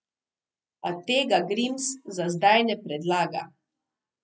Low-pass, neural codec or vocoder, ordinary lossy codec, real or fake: none; none; none; real